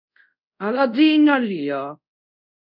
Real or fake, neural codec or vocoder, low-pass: fake; codec, 24 kHz, 0.5 kbps, DualCodec; 5.4 kHz